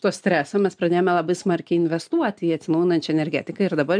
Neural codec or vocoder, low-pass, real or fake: none; 9.9 kHz; real